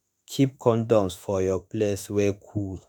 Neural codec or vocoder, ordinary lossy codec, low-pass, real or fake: autoencoder, 48 kHz, 32 numbers a frame, DAC-VAE, trained on Japanese speech; none; none; fake